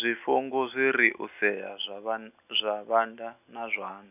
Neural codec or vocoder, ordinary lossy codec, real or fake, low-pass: none; none; real; 3.6 kHz